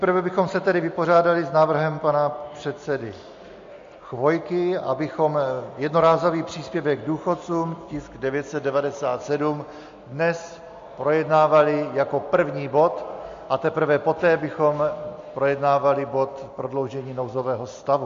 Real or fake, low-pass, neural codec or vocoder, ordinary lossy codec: real; 7.2 kHz; none; MP3, 48 kbps